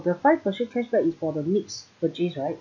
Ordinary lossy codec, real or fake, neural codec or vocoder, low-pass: none; real; none; 7.2 kHz